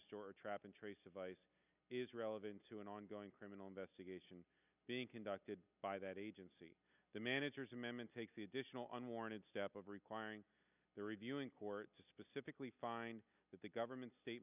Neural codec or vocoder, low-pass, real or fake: none; 3.6 kHz; real